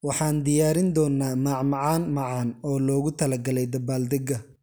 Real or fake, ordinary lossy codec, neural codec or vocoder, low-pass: real; none; none; none